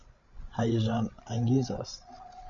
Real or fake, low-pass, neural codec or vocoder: fake; 7.2 kHz; codec, 16 kHz, 16 kbps, FreqCodec, larger model